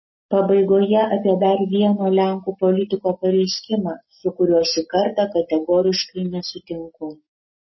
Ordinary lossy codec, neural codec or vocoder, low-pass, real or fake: MP3, 24 kbps; none; 7.2 kHz; real